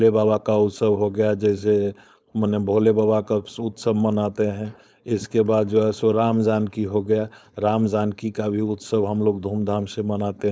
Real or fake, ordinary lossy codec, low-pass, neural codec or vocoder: fake; none; none; codec, 16 kHz, 4.8 kbps, FACodec